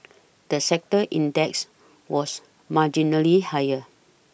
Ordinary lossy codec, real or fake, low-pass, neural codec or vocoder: none; real; none; none